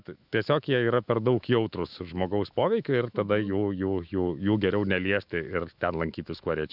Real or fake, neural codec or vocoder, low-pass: fake; autoencoder, 48 kHz, 128 numbers a frame, DAC-VAE, trained on Japanese speech; 5.4 kHz